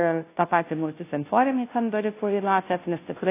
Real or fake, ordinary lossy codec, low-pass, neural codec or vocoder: fake; AAC, 24 kbps; 3.6 kHz; codec, 16 kHz, 0.5 kbps, FunCodec, trained on Chinese and English, 25 frames a second